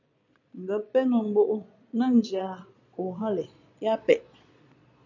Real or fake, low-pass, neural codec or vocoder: fake; 7.2 kHz; vocoder, 22.05 kHz, 80 mel bands, Vocos